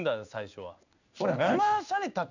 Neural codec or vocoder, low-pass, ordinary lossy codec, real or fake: codec, 16 kHz in and 24 kHz out, 1 kbps, XY-Tokenizer; 7.2 kHz; none; fake